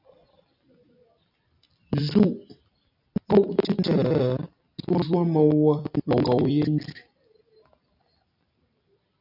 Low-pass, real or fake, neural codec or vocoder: 5.4 kHz; fake; vocoder, 44.1 kHz, 128 mel bands every 256 samples, BigVGAN v2